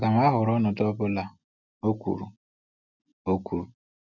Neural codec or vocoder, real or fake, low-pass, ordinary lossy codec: none; real; 7.2 kHz; none